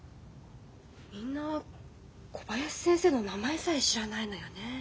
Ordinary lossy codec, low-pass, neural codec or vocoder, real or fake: none; none; none; real